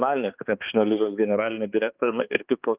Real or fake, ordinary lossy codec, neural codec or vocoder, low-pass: fake; Opus, 24 kbps; codec, 16 kHz, 2 kbps, X-Codec, HuBERT features, trained on balanced general audio; 3.6 kHz